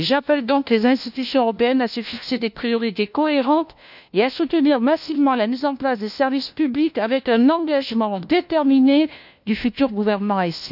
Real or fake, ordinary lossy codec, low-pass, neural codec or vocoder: fake; none; 5.4 kHz; codec, 16 kHz, 1 kbps, FunCodec, trained on LibriTTS, 50 frames a second